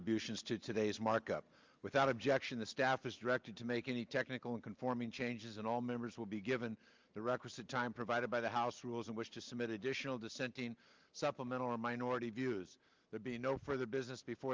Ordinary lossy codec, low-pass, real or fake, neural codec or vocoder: Opus, 32 kbps; 7.2 kHz; real; none